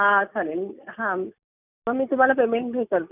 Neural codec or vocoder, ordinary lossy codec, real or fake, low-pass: none; none; real; 3.6 kHz